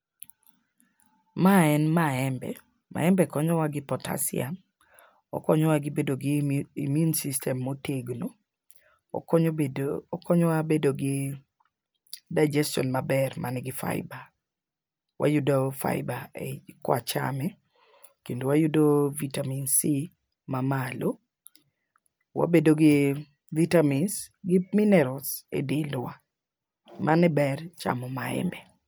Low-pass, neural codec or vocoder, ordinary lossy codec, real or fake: none; none; none; real